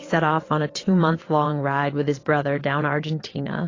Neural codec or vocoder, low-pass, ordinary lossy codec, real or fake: vocoder, 22.05 kHz, 80 mel bands, WaveNeXt; 7.2 kHz; AAC, 32 kbps; fake